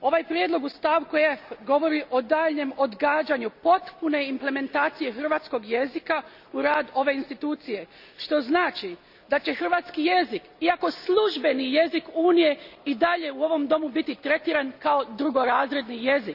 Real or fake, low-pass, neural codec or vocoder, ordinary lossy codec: real; 5.4 kHz; none; none